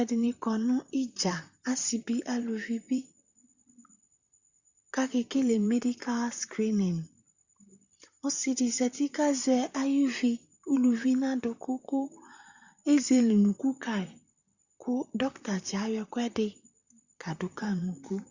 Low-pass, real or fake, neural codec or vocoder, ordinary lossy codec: 7.2 kHz; fake; vocoder, 44.1 kHz, 128 mel bands, Pupu-Vocoder; Opus, 64 kbps